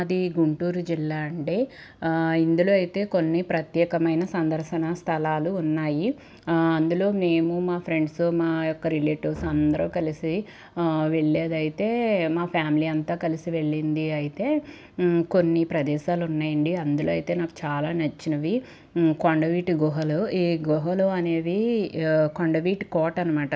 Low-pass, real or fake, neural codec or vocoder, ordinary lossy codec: none; real; none; none